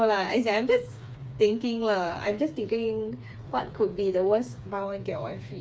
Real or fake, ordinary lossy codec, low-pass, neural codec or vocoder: fake; none; none; codec, 16 kHz, 4 kbps, FreqCodec, smaller model